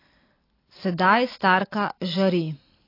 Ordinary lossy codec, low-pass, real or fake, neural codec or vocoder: AAC, 24 kbps; 5.4 kHz; real; none